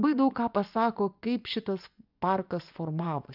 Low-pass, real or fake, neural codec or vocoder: 5.4 kHz; fake; vocoder, 24 kHz, 100 mel bands, Vocos